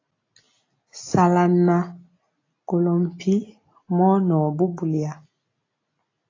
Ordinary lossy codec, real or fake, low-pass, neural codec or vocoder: AAC, 32 kbps; real; 7.2 kHz; none